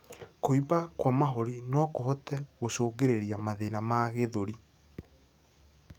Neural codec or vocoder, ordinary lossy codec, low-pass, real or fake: codec, 44.1 kHz, 7.8 kbps, DAC; none; 19.8 kHz; fake